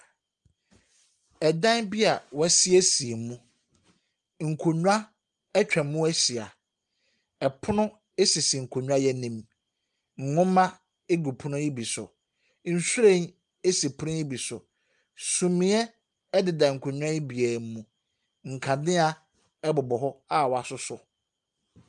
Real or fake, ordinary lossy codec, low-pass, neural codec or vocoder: real; Opus, 32 kbps; 10.8 kHz; none